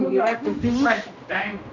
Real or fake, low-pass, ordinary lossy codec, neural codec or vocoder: fake; 7.2 kHz; none; codec, 16 kHz, 1 kbps, X-Codec, HuBERT features, trained on general audio